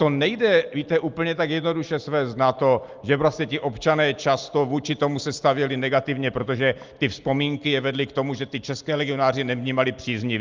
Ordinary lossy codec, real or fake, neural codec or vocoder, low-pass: Opus, 32 kbps; real; none; 7.2 kHz